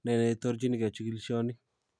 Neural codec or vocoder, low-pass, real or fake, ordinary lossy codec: none; 9.9 kHz; real; none